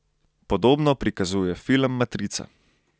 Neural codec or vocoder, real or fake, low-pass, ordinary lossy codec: none; real; none; none